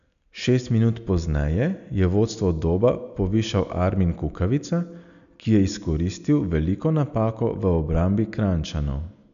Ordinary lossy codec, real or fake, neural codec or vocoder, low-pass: none; real; none; 7.2 kHz